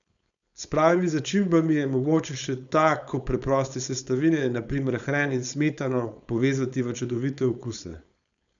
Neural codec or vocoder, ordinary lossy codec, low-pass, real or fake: codec, 16 kHz, 4.8 kbps, FACodec; none; 7.2 kHz; fake